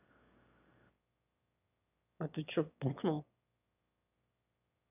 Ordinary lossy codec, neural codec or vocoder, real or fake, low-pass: none; autoencoder, 22.05 kHz, a latent of 192 numbers a frame, VITS, trained on one speaker; fake; 3.6 kHz